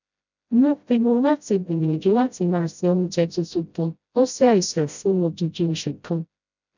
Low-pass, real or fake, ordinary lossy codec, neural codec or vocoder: 7.2 kHz; fake; none; codec, 16 kHz, 0.5 kbps, FreqCodec, smaller model